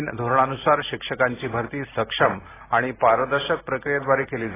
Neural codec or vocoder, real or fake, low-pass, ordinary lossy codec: none; real; 3.6 kHz; AAC, 16 kbps